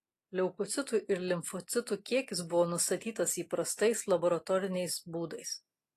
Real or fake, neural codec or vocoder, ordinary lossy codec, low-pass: real; none; AAC, 48 kbps; 14.4 kHz